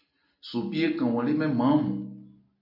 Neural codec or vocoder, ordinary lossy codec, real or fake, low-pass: none; AAC, 32 kbps; real; 5.4 kHz